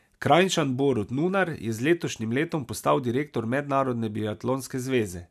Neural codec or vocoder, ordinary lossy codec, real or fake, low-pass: none; none; real; 14.4 kHz